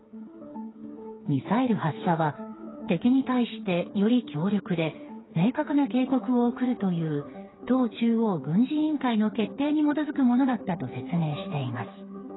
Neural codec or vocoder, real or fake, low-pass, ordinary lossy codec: codec, 16 kHz, 4 kbps, FreqCodec, smaller model; fake; 7.2 kHz; AAC, 16 kbps